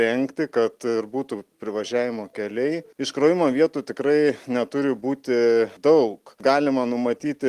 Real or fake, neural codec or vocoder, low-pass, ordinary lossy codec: real; none; 14.4 kHz; Opus, 24 kbps